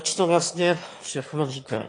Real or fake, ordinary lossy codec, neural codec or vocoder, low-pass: fake; AAC, 48 kbps; autoencoder, 22.05 kHz, a latent of 192 numbers a frame, VITS, trained on one speaker; 9.9 kHz